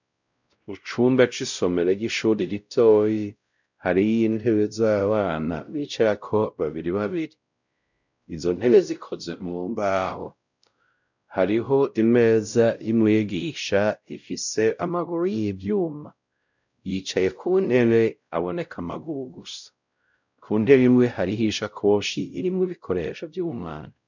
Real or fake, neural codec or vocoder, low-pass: fake; codec, 16 kHz, 0.5 kbps, X-Codec, WavLM features, trained on Multilingual LibriSpeech; 7.2 kHz